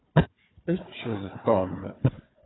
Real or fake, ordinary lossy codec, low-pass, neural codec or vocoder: fake; AAC, 16 kbps; 7.2 kHz; codec, 16 kHz, 4 kbps, FunCodec, trained on Chinese and English, 50 frames a second